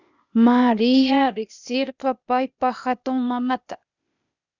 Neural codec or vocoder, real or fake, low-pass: codec, 16 kHz, 0.8 kbps, ZipCodec; fake; 7.2 kHz